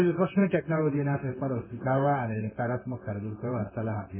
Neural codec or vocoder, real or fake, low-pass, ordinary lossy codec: codec, 16 kHz in and 24 kHz out, 1 kbps, XY-Tokenizer; fake; 3.6 kHz; none